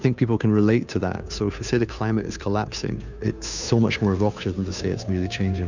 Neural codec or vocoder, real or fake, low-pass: codec, 16 kHz, 2 kbps, FunCodec, trained on Chinese and English, 25 frames a second; fake; 7.2 kHz